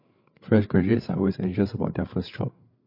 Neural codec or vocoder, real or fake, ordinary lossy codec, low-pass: codec, 16 kHz, 8 kbps, FreqCodec, larger model; fake; MP3, 32 kbps; 5.4 kHz